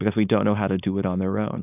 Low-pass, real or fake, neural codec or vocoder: 3.6 kHz; fake; codec, 16 kHz, 4.8 kbps, FACodec